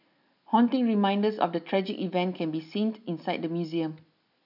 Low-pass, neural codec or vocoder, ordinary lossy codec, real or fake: 5.4 kHz; none; none; real